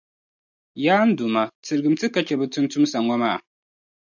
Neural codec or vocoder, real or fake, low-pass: none; real; 7.2 kHz